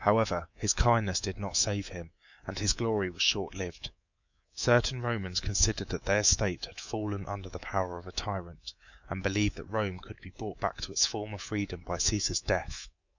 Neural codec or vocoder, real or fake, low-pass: codec, 24 kHz, 3.1 kbps, DualCodec; fake; 7.2 kHz